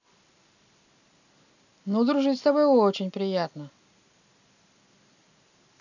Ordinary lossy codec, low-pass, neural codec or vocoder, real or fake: none; 7.2 kHz; none; real